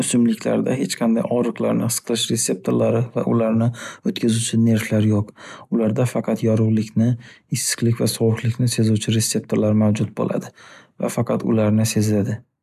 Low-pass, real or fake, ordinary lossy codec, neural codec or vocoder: 14.4 kHz; real; none; none